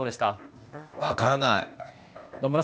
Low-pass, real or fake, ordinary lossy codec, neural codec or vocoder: none; fake; none; codec, 16 kHz, 0.8 kbps, ZipCodec